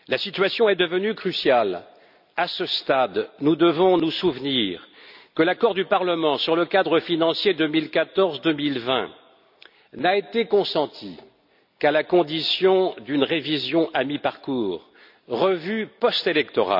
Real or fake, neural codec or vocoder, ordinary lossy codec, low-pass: real; none; none; 5.4 kHz